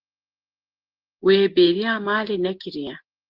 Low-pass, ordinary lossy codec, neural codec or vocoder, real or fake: 5.4 kHz; Opus, 16 kbps; none; real